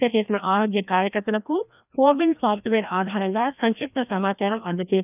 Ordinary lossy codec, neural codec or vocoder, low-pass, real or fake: none; codec, 16 kHz, 1 kbps, FreqCodec, larger model; 3.6 kHz; fake